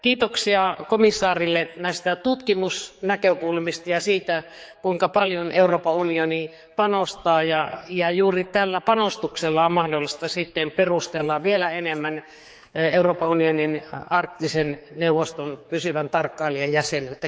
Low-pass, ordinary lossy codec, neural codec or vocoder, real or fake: none; none; codec, 16 kHz, 4 kbps, X-Codec, HuBERT features, trained on general audio; fake